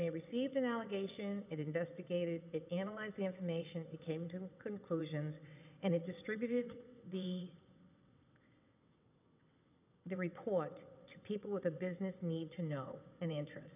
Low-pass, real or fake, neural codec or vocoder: 3.6 kHz; fake; vocoder, 22.05 kHz, 80 mel bands, WaveNeXt